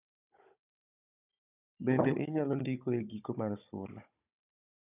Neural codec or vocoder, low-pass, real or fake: codec, 16 kHz, 16 kbps, FunCodec, trained on Chinese and English, 50 frames a second; 3.6 kHz; fake